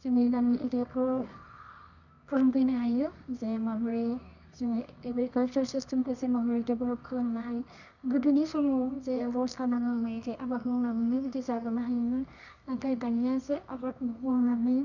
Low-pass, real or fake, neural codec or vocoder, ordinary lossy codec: 7.2 kHz; fake; codec, 24 kHz, 0.9 kbps, WavTokenizer, medium music audio release; none